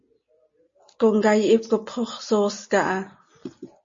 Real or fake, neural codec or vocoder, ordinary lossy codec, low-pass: real; none; MP3, 32 kbps; 7.2 kHz